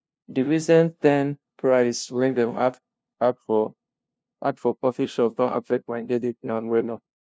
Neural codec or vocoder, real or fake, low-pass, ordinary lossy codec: codec, 16 kHz, 0.5 kbps, FunCodec, trained on LibriTTS, 25 frames a second; fake; none; none